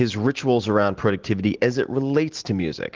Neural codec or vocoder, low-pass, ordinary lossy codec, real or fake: none; 7.2 kHz; Opus, 16 kbps; real